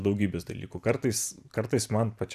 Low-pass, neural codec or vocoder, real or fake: 14.4 kHz; vocoder, 44.1 kHz, 128 mel bands every 512 samples, BigVGAN v2; fake